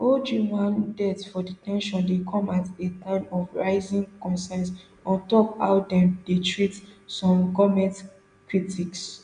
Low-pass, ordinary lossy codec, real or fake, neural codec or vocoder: 9.9 kHz; none; real; none